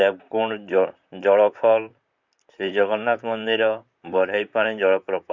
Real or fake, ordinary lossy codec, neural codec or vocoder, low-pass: fake; none; vocoder, 44.1 kHz, 128 mel bands, Pupu-Vocoder; 7.2 kHz